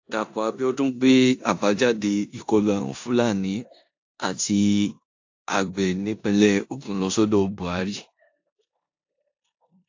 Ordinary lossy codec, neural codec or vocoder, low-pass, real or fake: AAC, 48 kbps; codec, 16 kHz in and 24 kHz out, 0.9 kbps, LongCat-Audio-Codec, four codebook decoder; 7.2 kHz; fake